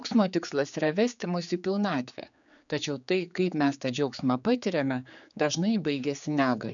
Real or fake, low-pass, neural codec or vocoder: fake; 7.2 kHz; codec, 16 kHz, 4 kbps, X-Codec, HuBERT features, trained on general audio